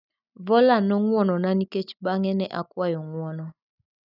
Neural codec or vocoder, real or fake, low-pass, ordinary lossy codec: none; real; 5.4 kHz; none